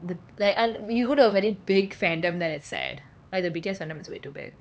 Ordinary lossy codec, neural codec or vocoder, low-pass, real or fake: none; codec, 16 kHz, 4 kbps, X-Codec, HuBERT features, trained on LibriSpeech; none; fake